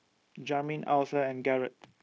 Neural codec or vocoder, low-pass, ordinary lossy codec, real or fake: codec, 16 kHz, 0.9 kbps, LongCat-Audio-Codec; none; none; fake